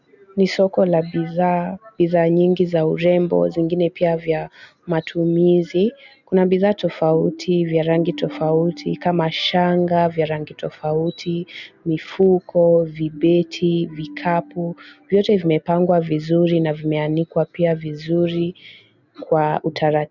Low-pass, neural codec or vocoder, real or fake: 7.2 kHz; none; real